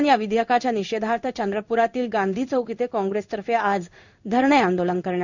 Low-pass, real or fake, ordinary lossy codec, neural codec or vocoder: 7.2 kHz; fake; none; codec, 16 kHz in and 24 kHz out, 1 kbps, XY-Tokenizer